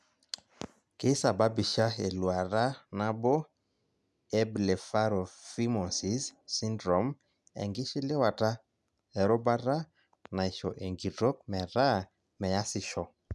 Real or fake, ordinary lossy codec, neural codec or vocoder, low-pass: real; none; none; none